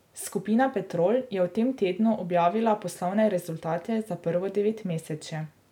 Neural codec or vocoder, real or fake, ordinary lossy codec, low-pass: none; real; none; 19.8 kHz